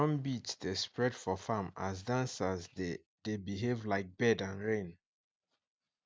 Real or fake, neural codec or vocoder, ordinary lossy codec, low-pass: real; none; none; none